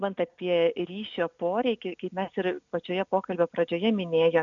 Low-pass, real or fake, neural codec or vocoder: 7.2 kHz; real; none